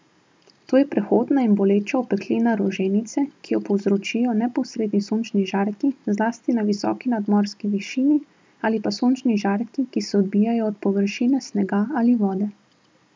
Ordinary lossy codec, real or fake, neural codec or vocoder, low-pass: none; real; none; none